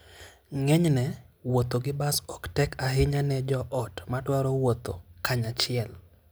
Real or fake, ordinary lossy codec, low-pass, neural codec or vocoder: real; none; none; none